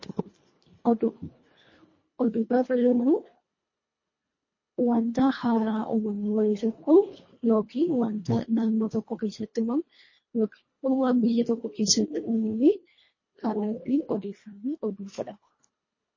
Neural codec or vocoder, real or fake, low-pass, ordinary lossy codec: codec, 24 kHz, 1.5 kbps, HILCodec; fake; 7.2 kHz; MP3, 32 kbps